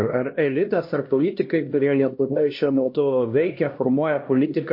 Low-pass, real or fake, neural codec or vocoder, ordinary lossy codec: 5.4 kHz; fake; codec, 16 kHz, 1 kbps, X-Codec, HuBERT features, trained on LibriSpeech; MP3, 32 kbps